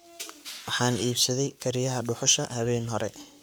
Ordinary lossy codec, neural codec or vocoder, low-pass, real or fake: none; codec, 44.1 kHz, 7.8 kbps, Pupu-Codec; none; fake